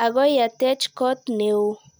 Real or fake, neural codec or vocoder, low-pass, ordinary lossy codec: real; none; none; none